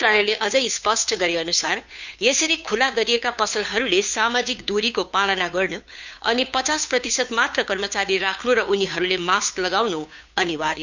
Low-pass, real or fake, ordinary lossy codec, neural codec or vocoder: 7.2 kHz; fake; none; codec, 16 kHz, 2 kbps, FunCodec, trained on Chinese and English, 25 frames a second